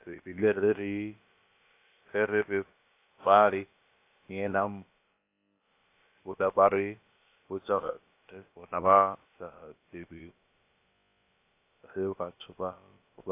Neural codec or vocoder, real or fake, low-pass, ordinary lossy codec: codec, 16 kHz, about 1 kbps, DyCAST, with the encoder's durations; fake; 3.6 kHz; AAC, 24 kbps